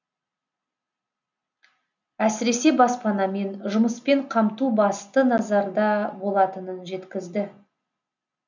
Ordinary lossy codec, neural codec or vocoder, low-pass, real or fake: none; none; 7.2 kHz; real